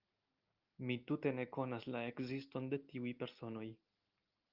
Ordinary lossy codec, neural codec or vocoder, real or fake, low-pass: Opus, 24 kbps; none; real; 5.4 kHz